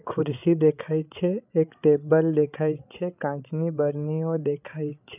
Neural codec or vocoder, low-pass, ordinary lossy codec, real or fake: codec, 16 kHz, 8 kbps, FreqCodec, larger model; 3.6 kHz; none; fake